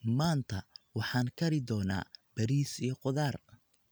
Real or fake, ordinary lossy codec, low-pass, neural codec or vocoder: real; none; none; none